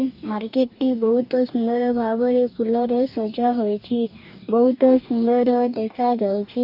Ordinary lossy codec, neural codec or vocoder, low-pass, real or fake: none; codec, 44.1 kHz, 2.6 kbps, DAC; 5.4 kHz; fake